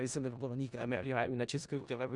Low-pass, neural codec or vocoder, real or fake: 10.8 kHz; codec, 16 kHz in and 24 kHz out, 0.4 kbps, LongCat-Audio-Codec, four codebook decoder; fake